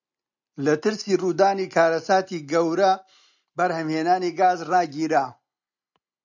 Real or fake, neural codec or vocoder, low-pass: real; none; 7.2 kHz